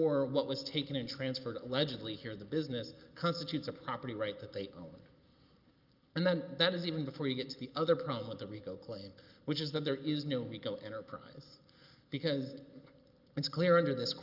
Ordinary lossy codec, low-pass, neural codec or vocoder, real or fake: Opus, 24 kbps; 5.4 kHz; none; real